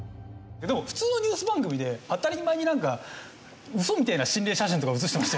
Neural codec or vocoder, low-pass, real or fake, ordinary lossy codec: none; none; real; none